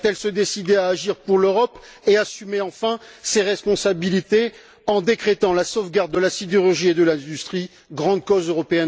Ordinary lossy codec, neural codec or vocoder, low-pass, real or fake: none; none; none; real